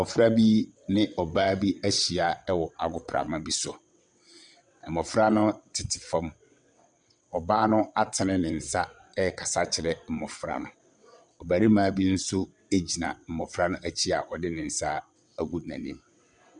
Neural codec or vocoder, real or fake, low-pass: vocoder, 22.05 kHz, 80 mel bands, WaveNeXt; fake; 9.9 kHz